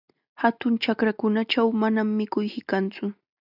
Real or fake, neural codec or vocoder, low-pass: real; none; 5.4 kHz